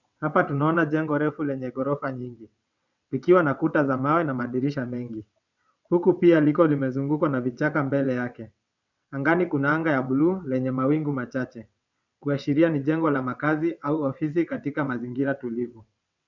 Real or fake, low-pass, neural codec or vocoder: fake; 7.2 kHz; vocoder, 22.05 kHz, 80 mel bands, WaveNeXt